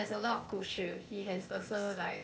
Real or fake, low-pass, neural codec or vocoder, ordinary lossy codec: fake; none; codec, 16 kHz, 0.8 kbps, ZipCodec; none